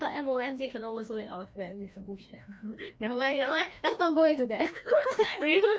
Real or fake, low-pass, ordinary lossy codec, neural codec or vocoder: fake; none; none; codec, 16 kHz, 1 kbps, FreqCodec, larger model